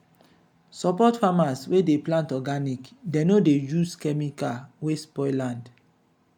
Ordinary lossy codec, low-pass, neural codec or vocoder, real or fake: none; 19.8 kHz; none; real